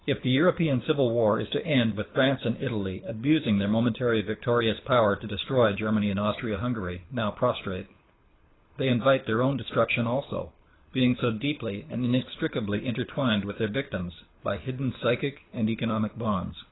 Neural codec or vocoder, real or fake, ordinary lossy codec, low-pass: codec, 24 kHz, 6 kbps, HILCodec; fake; AAC, 16 kbps; 7.2 kHz